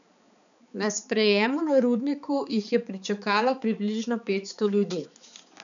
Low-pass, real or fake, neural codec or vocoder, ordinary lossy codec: 7.2 kHz; fake; codec, 16 kHz, 4 kbps, X-Codec, HuBERT features, trained on balanced general audio; none